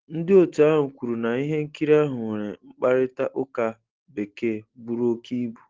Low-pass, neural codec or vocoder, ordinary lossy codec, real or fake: 7.2 kHz; none; Opus, 16 kbps; real